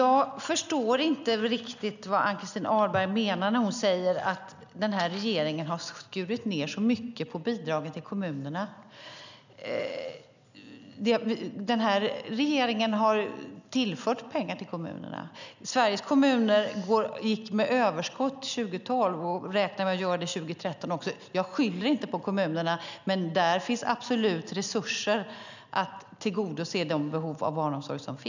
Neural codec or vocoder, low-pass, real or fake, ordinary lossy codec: none; 7.2 kHz; real; none